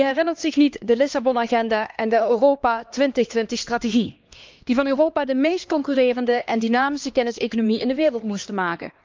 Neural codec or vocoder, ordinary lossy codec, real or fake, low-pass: codec, 16 kHz, 2 kbps, X-Codec, HuBERT features, trained on LibriSpeech; Opus, 24 kbps; fake; 7.2 kHz